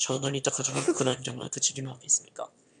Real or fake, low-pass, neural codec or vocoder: fake; 9.9 kHz; autoencoder, 22.05 kHz, a latent of 192 numbers a frame, VITS, trained on one speaker